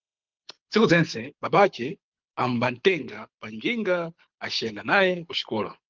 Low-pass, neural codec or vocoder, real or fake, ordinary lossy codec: 7.2 kHz; codec, 24 kHz, 6 kbps, HILCodec; fake; Opus, 32 kbps